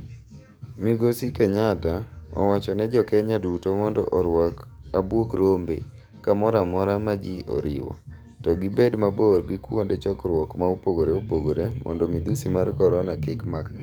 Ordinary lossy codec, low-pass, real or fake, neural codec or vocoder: none; none; fake; codec, 44.1 kHz, 7.8 kbps, DAC